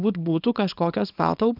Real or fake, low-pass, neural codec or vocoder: fake; 5.4 kHz; codec, 24 kHz, 0.9 kbps, WavTokenizer, small release